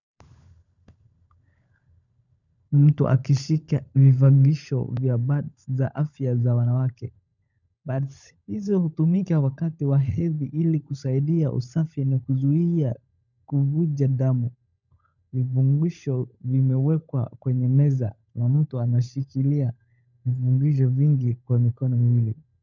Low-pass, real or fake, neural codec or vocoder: 7.2 kHz; fake; codec, 16 kHz, 16 kbps, FunCodec, trained on LibriTTS, 50 frames a second